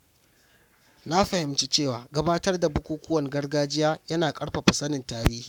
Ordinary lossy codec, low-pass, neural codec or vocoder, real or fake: none; 19.8 kHz; codec, 44.1 kHz, 7.8 kbps, Pupu-Codec; fake